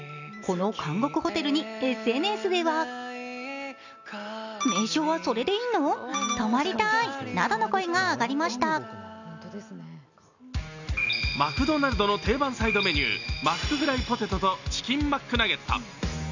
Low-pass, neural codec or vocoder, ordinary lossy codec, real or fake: 7.2 kHz; none; none; real